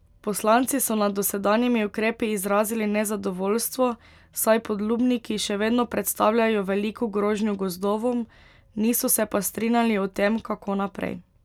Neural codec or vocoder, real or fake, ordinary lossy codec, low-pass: none; real; none; 19.8 kHz